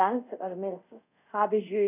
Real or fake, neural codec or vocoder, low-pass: fake; codec, 24 kHz, 0.5 kbps, DualCodec; 3.6 kHz